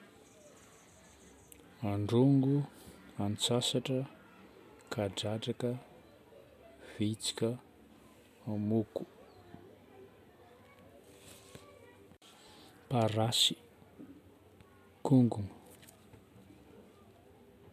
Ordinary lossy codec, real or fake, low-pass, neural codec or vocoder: none; real; 14.4 kHz; none